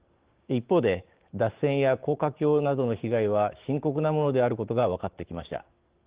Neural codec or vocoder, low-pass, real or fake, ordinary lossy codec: codec, 16 kHz, 6 kbps, DAC; 3.6 kHz; fake; Opus, 16 kbps